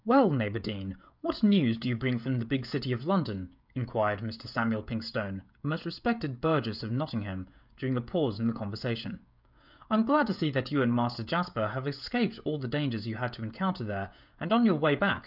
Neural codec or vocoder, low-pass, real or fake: codec, 16 kHz, 16 kbps, FreqCodec, smaller model; 5.4 kHz; fake